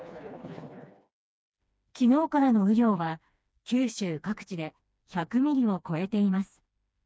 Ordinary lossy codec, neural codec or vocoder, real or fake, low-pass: none; codec, 16 kHz, 2 kbps, FreqCodec, smaller model; fake; none